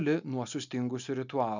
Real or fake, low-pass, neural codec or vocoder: real; 7.2 kHz; none